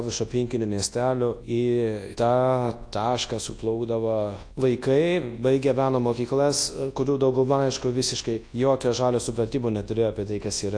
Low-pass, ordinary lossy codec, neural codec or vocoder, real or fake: 9.9 kHz; AAC, 48 kbps; codec, 24 kHz, 0.9 kbps, WavTokenizer, large speech release; fake